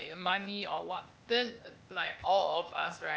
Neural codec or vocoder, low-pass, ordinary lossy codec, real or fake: codec, 16 kHz, 0.8 kbps, ZipCodec; none; none; fake